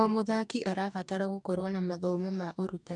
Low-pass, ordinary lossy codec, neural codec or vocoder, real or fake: 10.8 kHz; MP3, 96 kbps; codec, 44.1 kHz, 2.6 kbps, DAC; fake